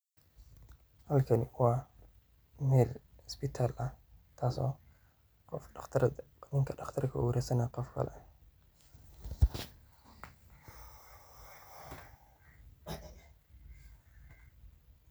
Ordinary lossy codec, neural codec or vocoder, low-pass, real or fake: none; none; none; real